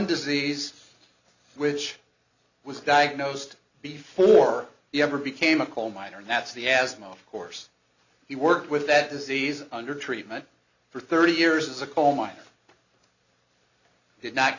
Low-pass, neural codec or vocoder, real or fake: 7.2 kHz; none; real